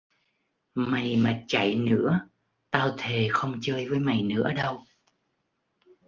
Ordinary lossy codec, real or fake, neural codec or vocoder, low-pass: Opus, 32 kbps; real; none; 7.2 kHz